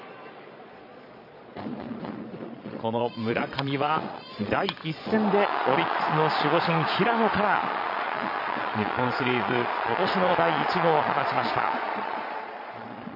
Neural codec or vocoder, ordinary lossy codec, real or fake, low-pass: vocoder, 22.05 kHz, 80 mel bands, Vocos; MP3, 48 kbps; fake; 5.4 kHz